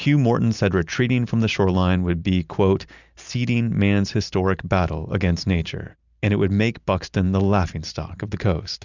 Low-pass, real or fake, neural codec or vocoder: 7.2 kHz; real; none